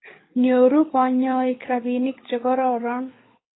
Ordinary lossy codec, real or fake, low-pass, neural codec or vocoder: AAC, 16 kbps; fake; 7.2 kHz; codec, 24 kHz, 6 kbps, HILCodec